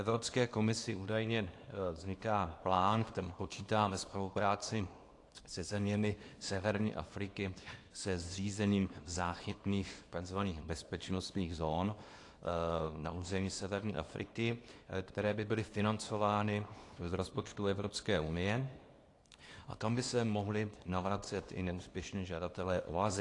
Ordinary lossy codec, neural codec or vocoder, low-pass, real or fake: AAC, 48 kbps; codec, 24 kHz, 0.9 kbps, WavTokenizer, small release; 10.8 kHz; fake